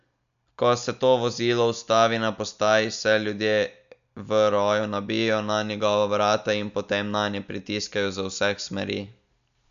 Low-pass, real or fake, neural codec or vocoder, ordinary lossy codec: 7.2 kHz; real; none; MP3, 96 kbps